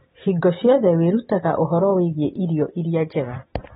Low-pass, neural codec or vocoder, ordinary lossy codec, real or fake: 19.8 kHz; none; AAC, 16 kbps; real